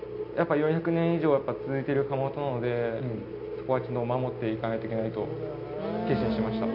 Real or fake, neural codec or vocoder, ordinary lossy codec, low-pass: real; none; none; 5.4 kHz